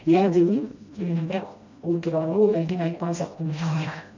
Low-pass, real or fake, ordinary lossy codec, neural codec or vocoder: 7.2 kHz; fake; MP3, 64 kbps; codec, 16 kHz, 1 kbps, FreqCodec, smaller model